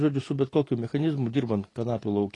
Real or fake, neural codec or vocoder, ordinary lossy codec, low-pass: real; none; AAC, 32 kbps; 10.8 kHz